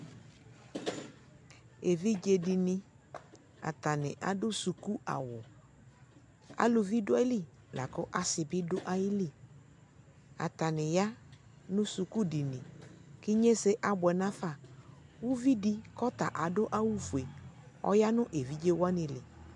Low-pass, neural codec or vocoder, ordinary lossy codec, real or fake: 10.8 kHz; none; MP3, 64 kbps; real